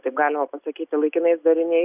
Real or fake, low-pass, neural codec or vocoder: real; 3.6 kHz; none